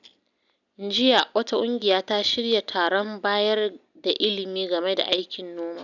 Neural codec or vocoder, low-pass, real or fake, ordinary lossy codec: none; 7.2 kHz; real; none